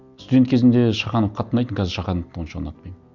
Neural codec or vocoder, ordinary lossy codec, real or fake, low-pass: none; none; real; 7.2 kHz